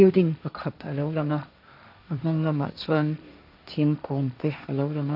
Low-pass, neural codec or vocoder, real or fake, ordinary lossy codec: 5.4 kHz; codec, 16 kHz, 1.1 kbps, Voila-Tokenizer; fake; none